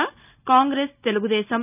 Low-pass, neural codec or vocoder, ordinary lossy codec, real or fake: 3.6 kHz; none; none; real